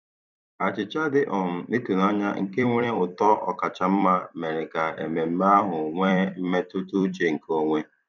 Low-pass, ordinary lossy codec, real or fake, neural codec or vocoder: 7.2 kHz; none; fake; vocoder, 44.1 kHz, 128 mel bands every 256 samples, BigVGAN v2